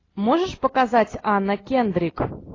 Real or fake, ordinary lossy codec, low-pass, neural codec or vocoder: real; AAC, 32 kbps; 7.2 kHz; none